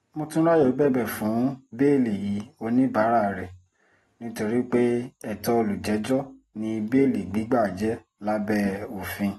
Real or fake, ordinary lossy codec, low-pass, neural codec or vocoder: real; AAC, 32 kbps; 19.8 kHz; none